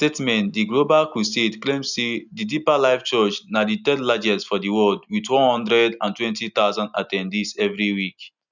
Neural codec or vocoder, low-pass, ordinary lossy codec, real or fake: none; 7.2 kHz; none; real